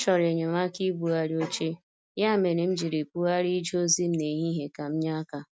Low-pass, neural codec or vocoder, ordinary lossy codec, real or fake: none; none; none; real